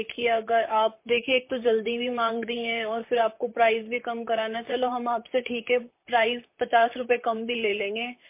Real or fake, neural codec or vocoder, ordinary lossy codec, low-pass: fake; vocoder, 44.1 kHz, 128 mel bands, Pupu-Vocoder; MP3, 24 kbps; 3.6 kHz